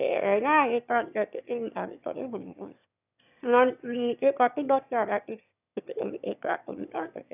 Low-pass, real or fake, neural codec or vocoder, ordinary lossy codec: 3.6 kHz; fake; autoencoder, 22.05 kHz, a latent of 192 numbers a frame, VITS, trained on one speaker; none